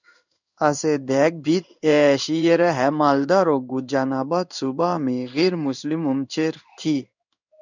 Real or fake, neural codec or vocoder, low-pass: fake; codec, 16 kHz in and 24 kHz out, 1 kbps, XY-Tokenizer; 7.2 kHz